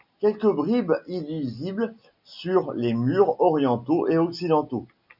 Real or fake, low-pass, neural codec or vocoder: real; 5.4 kHz; none